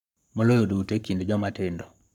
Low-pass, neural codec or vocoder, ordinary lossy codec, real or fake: 19.8 kHz; codec, 44.1 kHz, 7.8 kbps, Pupu-Codec; none; fake